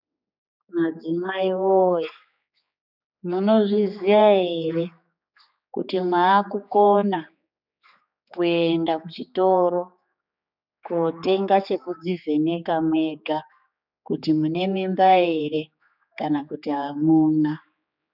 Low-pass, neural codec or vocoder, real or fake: 5.4 kHz; codec, 16 kHz, 4 kbps, X-Codec, HuBERT features, trained on general audio; fake